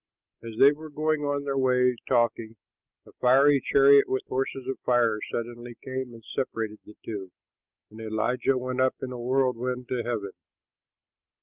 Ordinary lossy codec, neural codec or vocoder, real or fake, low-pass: Opus, 24 kbps; none; real; 3.6 kHz